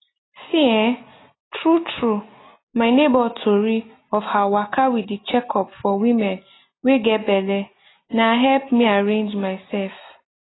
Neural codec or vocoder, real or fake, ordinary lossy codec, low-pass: none; real; AAC, 16 kbps; 7.2 kHz